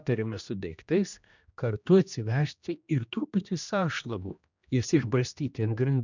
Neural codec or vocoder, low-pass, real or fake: codec, 16 kHz, 1 kbps, X-Codec, HuBERT features, trained on general audio; 7.2 kHz; fake